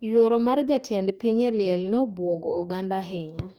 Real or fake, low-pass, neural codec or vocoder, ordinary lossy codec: fake; 19.8 kHz; codec, 44.1 kHz, 2.6 kbps, DAC; none